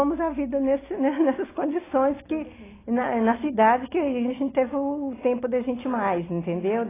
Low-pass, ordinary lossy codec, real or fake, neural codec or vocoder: 3.6 kHz; AAC, 16 kbps; real; none